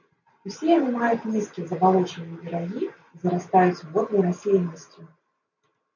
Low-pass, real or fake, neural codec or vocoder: 7.2 kHz; real; none